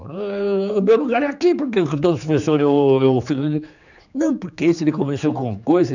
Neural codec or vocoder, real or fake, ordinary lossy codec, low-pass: codec, 16 kHz, 4 kbps, X-Codec, HuBERT features, trained on general audio; fake; none; 7.2 kHz